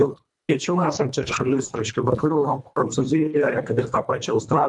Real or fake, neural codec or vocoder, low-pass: fake; codec, 24 kHz, 1.5 kbps, HILCodec; 10.8 kHz